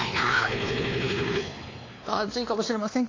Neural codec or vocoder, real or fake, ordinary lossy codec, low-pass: codec, 16 kHz, 2 kbps, X-Codec, WavLM features, trained on Multilingual LibriSpeech; fake; AAC, 32 kbps; 7.2 kHz